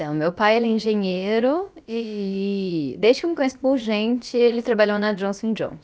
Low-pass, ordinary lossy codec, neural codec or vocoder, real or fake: none; none; codec, 16 kHz, about 1 kbps, DyCAST, with the encoder's durations; fake